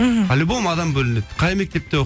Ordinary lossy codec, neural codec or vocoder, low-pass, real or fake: none; none; none; real